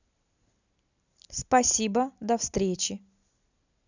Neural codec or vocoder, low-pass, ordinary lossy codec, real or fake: none; 7.2 kHz; none; real